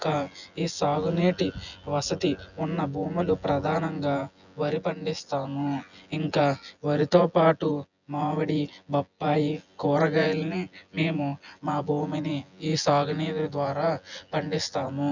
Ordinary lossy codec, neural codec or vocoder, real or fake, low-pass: none; vocoder, 24 kHz, 100 mel bands, Vocos; fake; 7.2 kHz